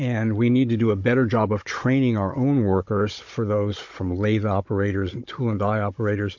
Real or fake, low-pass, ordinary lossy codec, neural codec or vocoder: fake; 7.2 kHz; MP3, 48 kbps; codec, 16 kHz, 16 kbps, FunCodec, trained on Chinese and English, 50 frames a second